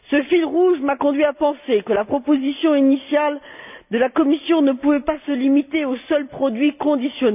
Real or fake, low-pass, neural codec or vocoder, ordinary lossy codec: real; 3.6 kHz; none; none